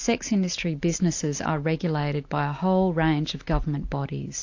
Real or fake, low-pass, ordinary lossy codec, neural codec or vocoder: real; 7.2 kHz; AAC, 48 kbps; none